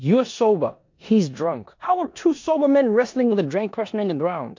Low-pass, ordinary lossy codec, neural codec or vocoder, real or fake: 7.2 kHz; MP3, 48 kbps; codec, 16 kHz in and 24 kHz out, 0.9 kbps, LongCat-Audio-Codec, four codebook decoder; fake